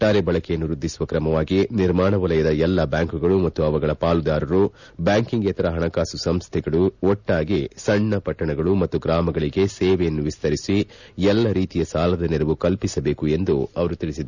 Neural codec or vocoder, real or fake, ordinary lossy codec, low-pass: none; real; none; 7.2 kHz